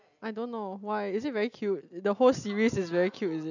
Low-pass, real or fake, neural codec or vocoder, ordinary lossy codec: 7.2 kHz; real; none; none